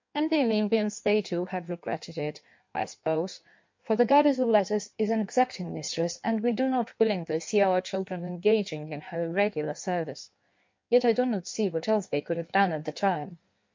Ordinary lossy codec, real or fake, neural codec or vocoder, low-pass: MP3, 48 kbps; fake; codec, 16 kHz in and 24 kHz out, 1.1 kbps, FireRedTTS-2 codec; 7.2 kHz